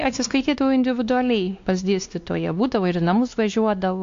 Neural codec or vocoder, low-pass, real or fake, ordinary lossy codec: codec, 16 kHz, 2 kbps, X-Codec, WavLM features, trained on Multilingual LibriSpeech; 7.2 kHz; fake; AAC, 64 kbps